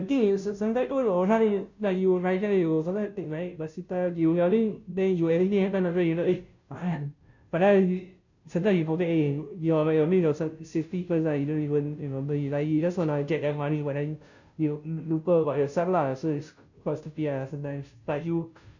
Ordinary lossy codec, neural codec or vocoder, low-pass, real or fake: none; codec, 16 kHz, 0.5 kbps, FunCodec, trained on Chinese and English, 25 frames a second; 7.2 kHz; fake